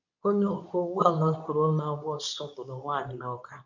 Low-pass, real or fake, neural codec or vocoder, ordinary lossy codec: 7.2 kHz; fake; codec, 24 kHz, 0.9 kbps, WavTokenizer, medium speech release version 2; none